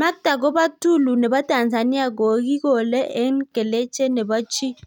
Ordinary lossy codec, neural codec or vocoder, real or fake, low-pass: none; none; real; 19.8 kHz